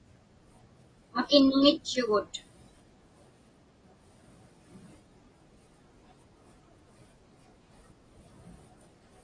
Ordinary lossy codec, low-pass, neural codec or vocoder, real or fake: AAC, 32 kbps; 9.9 kHz; none; real